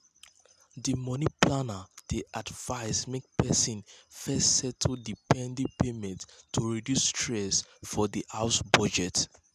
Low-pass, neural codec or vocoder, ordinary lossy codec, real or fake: 14.4 kHz; none; none; real